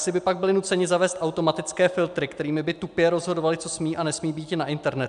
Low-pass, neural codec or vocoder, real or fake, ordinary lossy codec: 10.8 kHz; none; real; MP3, 96 kbps